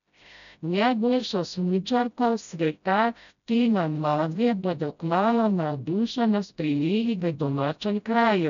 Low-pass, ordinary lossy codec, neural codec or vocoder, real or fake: 7.2 kHz; none; codec, 16 kHz, 0.5 kbps, FreqCodec, smaller model; fake